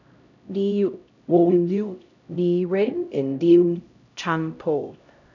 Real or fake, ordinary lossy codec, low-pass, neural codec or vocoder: fake; none; 7.2 kHz; codec, 16 kHz, 0.5 kbps, X-Codec, HuBERT features, trained on LibriSpeech